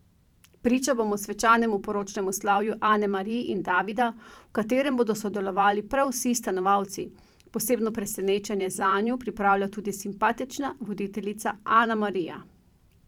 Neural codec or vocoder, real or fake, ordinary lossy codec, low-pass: vocoder, 44.1 kHz, 128 mel bands every 512 samples, BigVGAN v2; fake; none; 19.8 kHz